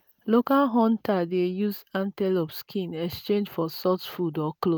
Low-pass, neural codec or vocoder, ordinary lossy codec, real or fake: none; none; none; real